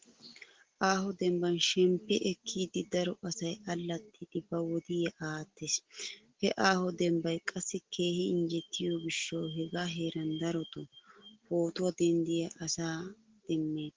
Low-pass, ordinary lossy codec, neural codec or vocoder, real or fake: 7.2 kHz; Opus, 16 kbps; none; real